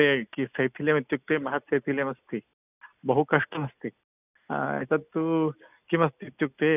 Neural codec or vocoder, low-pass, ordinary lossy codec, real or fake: none; 3.6 kHz; none; real